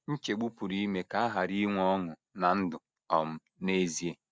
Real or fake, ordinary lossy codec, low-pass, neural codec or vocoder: real; none; none; none